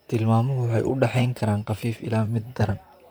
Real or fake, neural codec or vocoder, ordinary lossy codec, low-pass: fake; vocoder, 44.1 kHz, 128 mel bands, Pupu-Vocoder; none; none